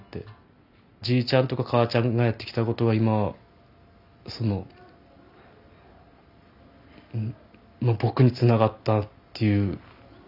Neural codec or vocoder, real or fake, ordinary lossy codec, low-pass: none; real; none; 5.4 kHz